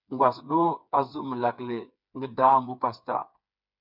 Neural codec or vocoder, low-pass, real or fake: codec, 16 kHz, 4 kbps, FreqCodec, smaller model; 5.4 kHz; fake